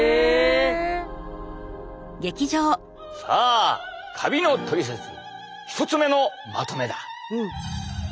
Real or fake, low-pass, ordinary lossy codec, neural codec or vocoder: real; none; none; none